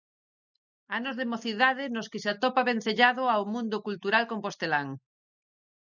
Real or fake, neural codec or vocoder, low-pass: real; none; 7.2 kHz